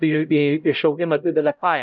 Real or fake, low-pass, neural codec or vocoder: fake; 7.2 kHz; codec, 16 kHz, 0.5 kbps, X-Codec, HuBERT features, trained on LibriSpeech